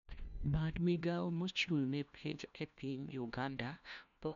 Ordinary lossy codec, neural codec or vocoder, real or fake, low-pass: none; codec, 16 kHz, 1 kbps, FunCodec, trained on LibriTTS, 50 frames a second; fake; 7.2 kHz